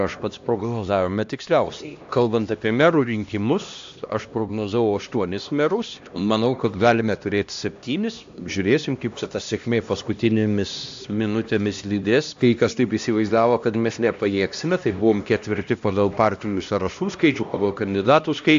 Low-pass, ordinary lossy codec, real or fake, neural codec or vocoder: 7.2 kHz; AAC, 64 kbps; fake; codec, 16 kHz, 1 kbps, X-Codec, HuBERT features, trained on LibriSpeech